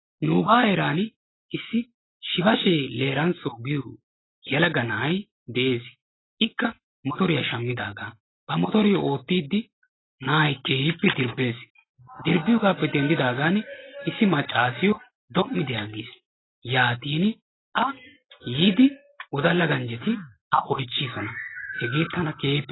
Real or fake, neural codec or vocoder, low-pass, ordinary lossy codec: real; none; 7.2 kHz; AAC, 16 kbps